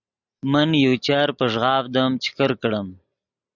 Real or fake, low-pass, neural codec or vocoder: real; 7.2 kHz; none